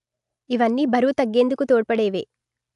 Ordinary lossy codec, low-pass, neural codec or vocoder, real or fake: AAC, 96 kbps; 10.8 kHz; none; real